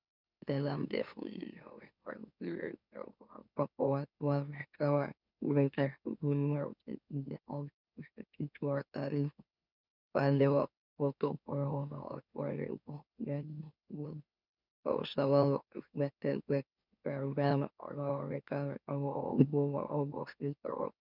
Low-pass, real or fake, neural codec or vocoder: 5.4 kHz; fake; autoencoder, 44.1 kHz, a latent of 192 numbers a frame, MeloTTS